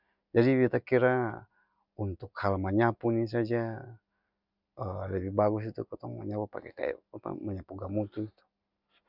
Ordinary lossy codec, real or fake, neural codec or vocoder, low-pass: none; real; none; 5.4 kHz